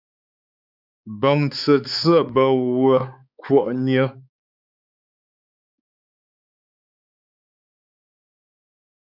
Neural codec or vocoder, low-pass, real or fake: codec, 16 kHz, 4 kbps, X-Codec, HuBERT features, trained on balanced general audio; 5.4 kHz; fake